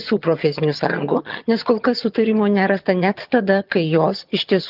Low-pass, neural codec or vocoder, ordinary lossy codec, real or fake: 5.4 kHz; vocoder, 22.05 kHz, 80 mel bands, HiFi-GAN; Opus, 32 kbps; fake